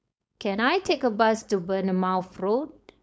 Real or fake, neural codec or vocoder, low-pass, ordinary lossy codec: fake; codec, 16 kHz, 4.8 kbps, FACodec; none; none